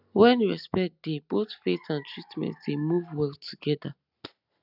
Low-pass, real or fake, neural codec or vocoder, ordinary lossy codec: 5.4 kHz; real; none; none